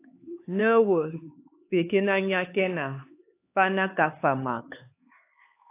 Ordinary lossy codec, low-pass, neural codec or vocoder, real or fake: AAC, 24 kbps; 3.6 kHz; codec, 16 kHz, 4 kbps, X-Codec, HuBERT features, trained on LibriSpeech; fake